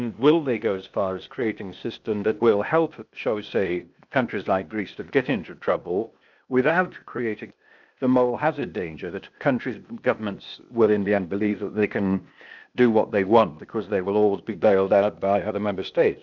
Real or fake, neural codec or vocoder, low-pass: fake; codec, 16 kHz, 0.8 kbps, ZipCodec; 7.2 kHz